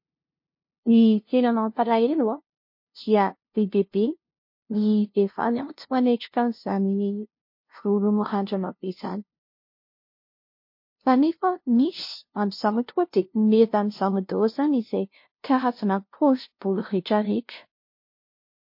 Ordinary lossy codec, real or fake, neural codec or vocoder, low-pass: MP3, 32 kbps; fake; codec, 16 kHz, 0.5 kbps, FunCodec, trained on LibriTTS, 25 frames a second; 5.4 kHz